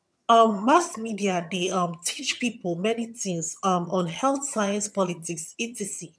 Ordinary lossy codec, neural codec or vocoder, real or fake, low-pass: none; vocoder, 22.05 kHz, 80 mel bands, HiFi-GAN; fake; none